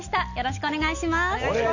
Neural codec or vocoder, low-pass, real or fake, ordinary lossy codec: none; 7.2 kHz; real; none